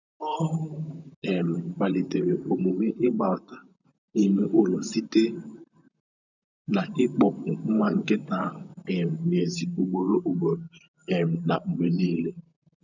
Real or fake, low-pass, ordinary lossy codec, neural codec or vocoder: fake; 7.2 kHz; none; vocoder, 44.1 kHz, 128 mel bands, Pupu-Vocoder